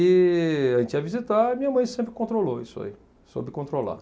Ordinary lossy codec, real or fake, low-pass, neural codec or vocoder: none; real; none; none